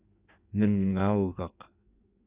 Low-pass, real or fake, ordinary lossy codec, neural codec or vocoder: 3.6 kHz; fake; Opus, 64 kbps; codec, 16 kHz in and 24 kHz out, 1.1 kbps, FireRedTTS-2 codec